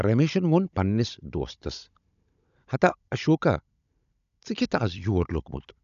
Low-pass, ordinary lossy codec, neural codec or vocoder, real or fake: 7.2 kHz; none; codec, 16 kHz, 16 kbps, FunCodec, trained on Chinese and English, 50 frames a second; fake